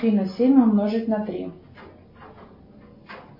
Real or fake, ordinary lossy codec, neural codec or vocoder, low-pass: real; MP3, 24 kbps; none; 5.4 kHz